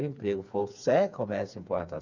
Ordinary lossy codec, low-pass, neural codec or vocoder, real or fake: none; 7.2 kHz; codec, 16 kHz, 4 kbps, FreqCodec, smaller model; fake